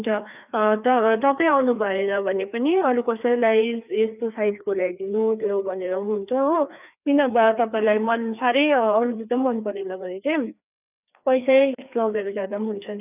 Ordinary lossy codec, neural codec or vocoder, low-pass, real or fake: none; codec, 16 kHz, 2 kbps, FreqCodec, larger model; 3.6 kHz; fake